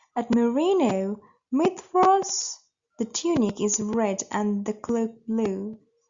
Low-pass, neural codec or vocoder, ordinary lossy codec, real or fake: 7.2 kHz; none; Opus, 64 kbps; real